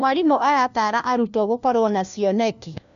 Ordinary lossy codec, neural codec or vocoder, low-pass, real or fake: none; codec, 16 kHz, 1 kbps, FunCodec, trained on LibriTTS, 50 frames a second; 7.2 kHz; fake